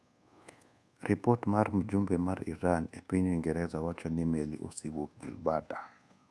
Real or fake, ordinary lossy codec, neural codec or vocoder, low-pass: fake; none; codec, 24 kHz, 1.2 kbps, DualCodec; none